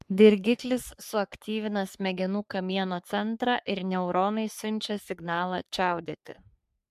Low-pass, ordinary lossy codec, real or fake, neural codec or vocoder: 14.4 kHz; MP3, 96 kbps; fake; codec, 44.1 kHz, 3.4 kbps, Pupu-Codec